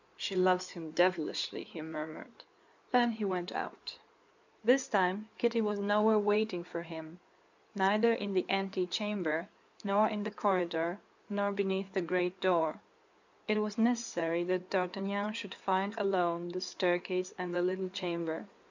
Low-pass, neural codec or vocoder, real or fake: 7.2 kHz; codec, 16 kHz in and 24 kHz out, 2.2 kbps, FireRedTTS-2 codec; fake